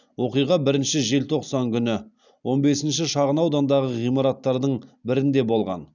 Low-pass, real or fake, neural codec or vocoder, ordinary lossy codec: 7.2 kHz; real; none; none